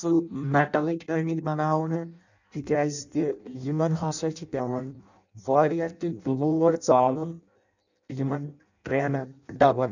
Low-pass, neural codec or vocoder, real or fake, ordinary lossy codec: 7.2 kHz; codec, 16 kHz in and 24 kHz out, 0.6 kbps, FireRedTTS-2 codec; fake; none